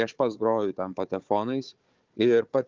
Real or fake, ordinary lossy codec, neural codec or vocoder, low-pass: fake; Opus, 24 kbps; codec, 16 kHz, 4 kbps, FunCodec, trained on Chinese and English, 50 frames a second; 7.2 kHz